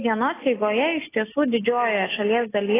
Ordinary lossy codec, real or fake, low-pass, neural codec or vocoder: AAC, 16 kbps; real; 3.6 kHz; none